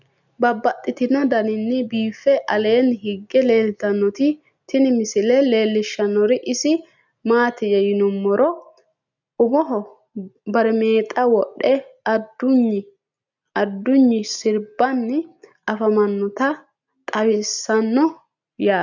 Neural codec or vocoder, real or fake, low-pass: none; real; 7.2 kHz